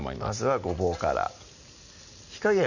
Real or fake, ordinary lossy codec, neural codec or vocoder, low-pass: real; none; none; 7.2 kHz